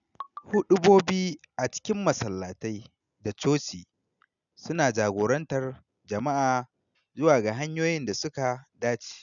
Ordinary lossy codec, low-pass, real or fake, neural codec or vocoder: none; 7.2 kHz; real; none